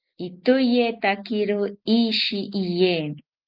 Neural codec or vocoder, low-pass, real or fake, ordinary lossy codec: none; 5.4 kHz; real; Opus, 32 kbps